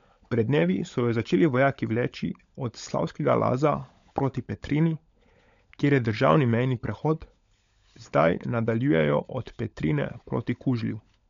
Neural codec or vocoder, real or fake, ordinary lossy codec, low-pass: codec, 16 kHz, 16 kbps, FunCodec, trained on LibriTTS, 50 frames a second; fake; MP3, 64 kbps; 7.2 kHz